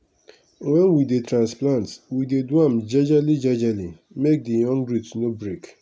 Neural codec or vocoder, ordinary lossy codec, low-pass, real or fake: none; none; none; real